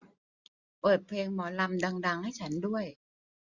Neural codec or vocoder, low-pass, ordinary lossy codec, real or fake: none; 7.2 kHz; Opus, 64 kbps; real